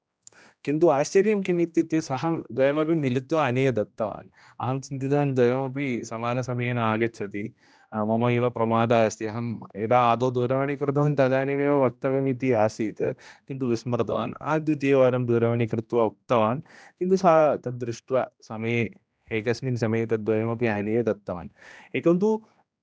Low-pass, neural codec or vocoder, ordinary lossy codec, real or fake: none; codec, 16 kHz, 1 kbps, X-Codec, HuBERT features, trained on general audio; none; fake